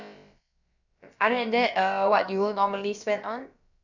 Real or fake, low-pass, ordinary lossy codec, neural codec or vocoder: fake; 7.2 kHz; none; codec, 16 kHz, about 1 kbps, DyCAST, with the encoder's durations